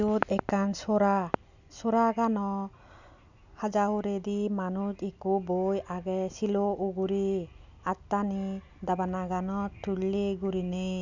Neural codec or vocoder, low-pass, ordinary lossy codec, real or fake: none; 7.2 kHz; none; real